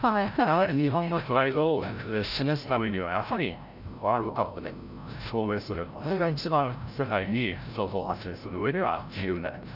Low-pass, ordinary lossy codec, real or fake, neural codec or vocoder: 5.4 kHz; none; fake; codec, 16 kHz, 0.5 kbps, FreqCodec, larger model